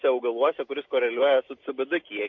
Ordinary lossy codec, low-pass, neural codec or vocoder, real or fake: MP3, 48 kbps; 7.2 kHz; none; real